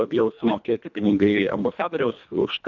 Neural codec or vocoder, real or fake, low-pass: codec, 24 kHz, 1.5 kbps, HILCodec; fake; 7.2 kHz